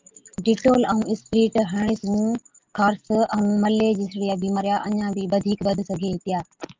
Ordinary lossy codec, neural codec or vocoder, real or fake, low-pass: Opus, 24 kbps; none; real; 7.2 kHz